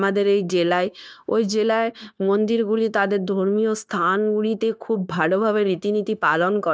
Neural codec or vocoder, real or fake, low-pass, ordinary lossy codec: codec, 16 kHz, 0.9 kbps, LongCat-Audio-Codec; fake; none; none